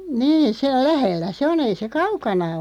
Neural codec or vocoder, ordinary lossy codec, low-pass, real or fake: none; none; 19.8 kHz; real